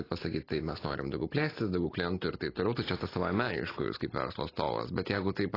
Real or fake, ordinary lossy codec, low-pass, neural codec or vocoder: real; AAC, 24 kbps; 5.4 kHz; none